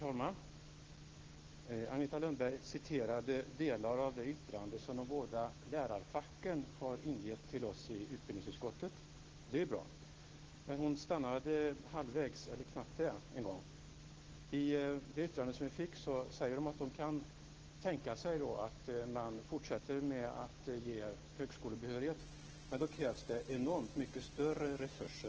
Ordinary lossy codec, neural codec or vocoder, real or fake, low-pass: Opus, 16 kbps; autoencoder, 48 kHz, 128 numbers a frame, DAC-VAE, trained on Japanese speech; fake; 7.2 kHz